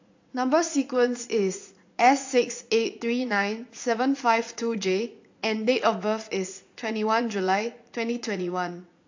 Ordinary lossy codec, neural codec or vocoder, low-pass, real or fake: AAC, 48 kbps; vocoder, 44.1 kHz, 80 mel bands, Vocos; 7.2 kHz; fake